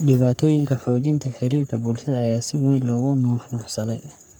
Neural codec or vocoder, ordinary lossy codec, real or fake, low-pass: codec, 44.1 kHz, 3.4 kbps, Pupu-Codec; none; fake; none